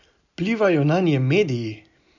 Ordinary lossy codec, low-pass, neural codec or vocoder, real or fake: none; 7.2 kHz; none; real